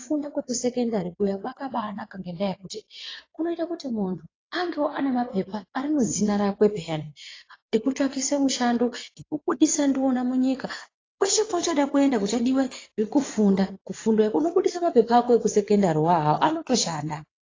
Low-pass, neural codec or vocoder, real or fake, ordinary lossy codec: 7.2 kHz; vocoder, 22.05 kHz, 80 mel bands, WaveNeXt; fake; AAC, 32 kbps